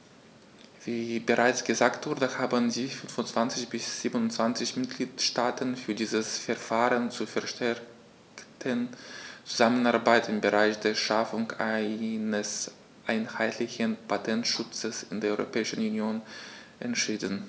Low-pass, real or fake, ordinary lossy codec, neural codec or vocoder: none; real; none; none